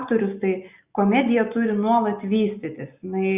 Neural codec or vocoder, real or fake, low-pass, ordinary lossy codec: none; real; 3.6 kHz; Opus, 64 kbps